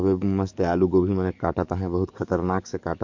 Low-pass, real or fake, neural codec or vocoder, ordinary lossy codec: 7.2 kHz; fake; codec, 44.1 kHz, 7.8 kbps, DAC; MP3, 48 kbps